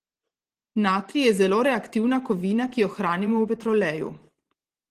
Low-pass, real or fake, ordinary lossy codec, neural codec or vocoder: 14.4 kHz; fake; Opus, 16 kbps; vocoder, 44.1 kHz, 128 mel bands every 512 samples, BigVGAN v2